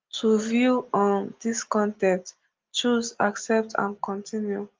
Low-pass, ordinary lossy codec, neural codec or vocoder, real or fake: 7.2 kHz; Opus, 32 kbps; none; real